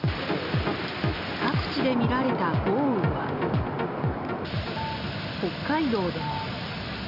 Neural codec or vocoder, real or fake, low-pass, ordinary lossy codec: none; real; 5.4 kHz; none